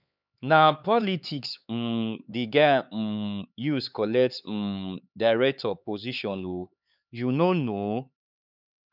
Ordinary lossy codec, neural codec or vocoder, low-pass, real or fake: none; codec, 16 kHz, 4 kbps, X-Codec, HuBERT features, trained on LibriSpeech; 5.4 kHz; fake